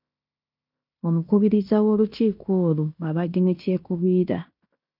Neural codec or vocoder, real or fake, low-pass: codec, 16 kHz in and 24 kHz out, 0.9 kbps, LongCat-Audio-Codec, fine tuned four codebook decoder; fake; 5.4 kHz